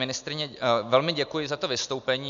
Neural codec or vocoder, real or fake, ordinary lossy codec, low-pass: none; real; AAC, 96 kbps; 7.2 kHz